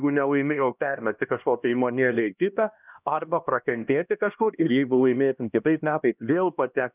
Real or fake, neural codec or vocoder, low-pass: fake; codec, 16 kHz, 1 kbps, X-Codec, HuBERT features, trained on LibriSpeech; 3.6 kHz